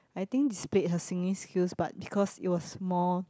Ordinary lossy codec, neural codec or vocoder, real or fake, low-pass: none; none; real; none